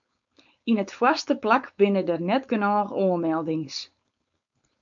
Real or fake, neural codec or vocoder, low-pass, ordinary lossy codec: fake; codec, 16 kHz, 4.8 kbps, FACodec; 7.2 kHz; MP3, 64 kbps